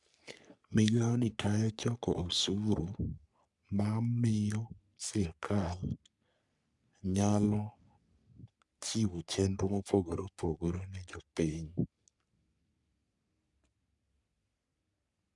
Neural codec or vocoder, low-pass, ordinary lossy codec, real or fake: codec, 44.1 kHz, 3.4 kbps, Pupu-Codec; 10.8 kHz; none; fake